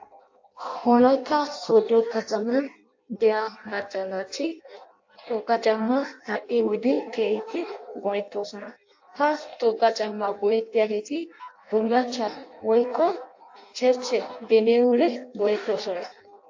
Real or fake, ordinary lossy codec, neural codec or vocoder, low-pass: fake; AAC, 48 kbps; codec, 16 kHz in and 24 kHz out, 0.6 kbps, FireRedTTS-2 codec; 7.2 kHz